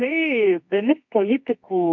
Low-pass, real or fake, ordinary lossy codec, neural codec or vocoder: 7.2 kHz; fake; MP3, 64 kbps; codec, 24 kHz, 0.9 kbps, WavTokenizer, medium music audio release